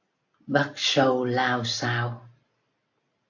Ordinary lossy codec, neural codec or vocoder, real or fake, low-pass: AAC, 48 kbps; none; real; 7.2 kHz